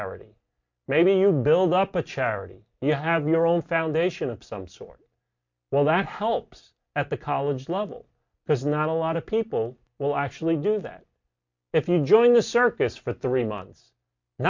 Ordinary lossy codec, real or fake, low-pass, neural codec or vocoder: MP3, 48 kbps; real; 7.2 kHz; none